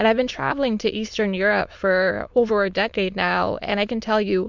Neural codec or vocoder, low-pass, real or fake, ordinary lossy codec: autoencoder, 22.05 kHz, a latent of 192 numbers a frame, VITS, trained on many speakers; 7.2 kHz; fake; MP3, 64 kbps